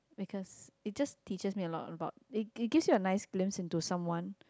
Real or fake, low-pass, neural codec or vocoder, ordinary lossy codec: real; none; none; none